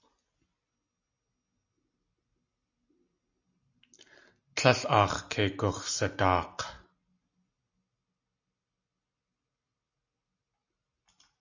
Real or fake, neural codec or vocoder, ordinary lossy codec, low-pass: real; none; AAC, 48 kbps; 7.2 kHz